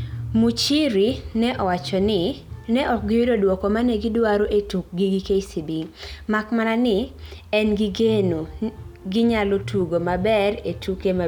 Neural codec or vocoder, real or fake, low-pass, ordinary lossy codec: none; real; 19.8 kHz; none